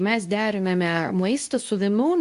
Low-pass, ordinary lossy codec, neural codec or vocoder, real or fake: 10.8 kHz; AAC, 64 kbps; codec, 24 kHz, 0.9 kbps, WavTokenizer, medium speech release version 2; fake